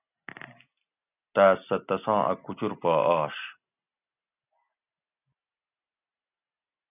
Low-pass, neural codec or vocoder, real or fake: 3.6 kHz; none; real